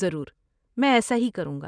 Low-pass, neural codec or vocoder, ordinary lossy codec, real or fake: 9.9 kHz; none; none; real